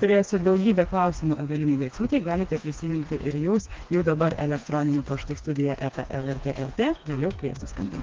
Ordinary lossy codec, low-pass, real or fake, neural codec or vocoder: Opus, 24 kbps; 7.2 kHz; fake; codec, 16 kHz, 2 kbps, FreqCodec, smaller model